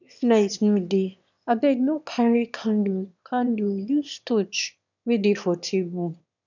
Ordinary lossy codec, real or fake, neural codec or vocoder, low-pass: none; fake; autoencoder, 22.05 kHz, a latent of 192 numbers a frame, VITS, trained on one speaker; 7.2 kHz